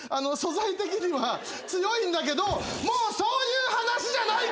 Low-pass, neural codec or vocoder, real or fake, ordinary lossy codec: none; none; real; none